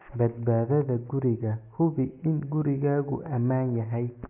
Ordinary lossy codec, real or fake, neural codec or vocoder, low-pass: none; real; none; 3.6 kHz